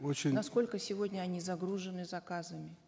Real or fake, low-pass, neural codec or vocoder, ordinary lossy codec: real; none; none; none